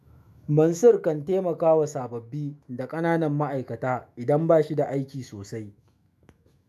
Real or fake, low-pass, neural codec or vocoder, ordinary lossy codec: fake; 14.4 kHz; autoencoder, 48 kHz, 128 numbers a frame, DAC-VAE, trained on Japanese speech; none